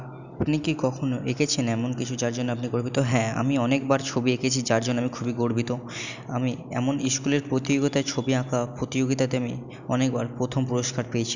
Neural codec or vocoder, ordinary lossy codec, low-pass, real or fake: none; none; 7.2 kHz; real